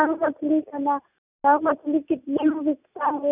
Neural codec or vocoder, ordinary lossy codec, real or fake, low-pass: none; none; real; 3.6 kHz